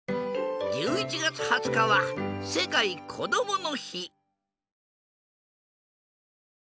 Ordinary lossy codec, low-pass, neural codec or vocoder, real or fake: none; none; none; real